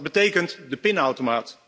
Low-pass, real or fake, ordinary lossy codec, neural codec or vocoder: none; real; none; none